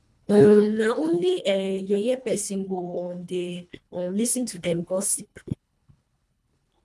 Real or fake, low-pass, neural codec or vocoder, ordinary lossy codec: fake; none; codec, 24 kHz, 1.5 kbps, HILCodec; none